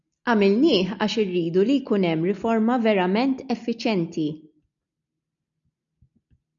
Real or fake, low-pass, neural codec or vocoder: real; 7.2 kHz; none